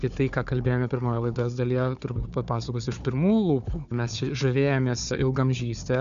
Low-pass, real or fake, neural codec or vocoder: 7.2 kHz; fake; codec, 16 kHz, 4 kbps, FunCodec, trained on Chinese and English, 50 frames a second